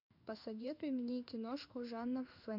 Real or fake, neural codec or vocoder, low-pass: fake; codec, 16 kHz in and 24 kHz out, 1 kbps, XY-Tokenizer; 5.4 kHz